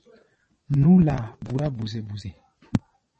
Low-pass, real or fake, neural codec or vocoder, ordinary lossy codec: 9.9 kHz; fake; vocoder, 22.05 kHz, 80 mel bands, Vocos; MP3, 32 kbps